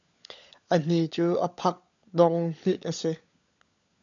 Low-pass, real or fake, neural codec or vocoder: 7.2 kHz; fake; codec, 16 kHz, 16 kbps, FunCodec, trained on LibriTTS, 50 frames a second